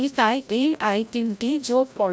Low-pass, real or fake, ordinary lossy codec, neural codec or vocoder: none; fake; none; codec, 16 kHz, 0.5 kbps, FreqCodec, larger model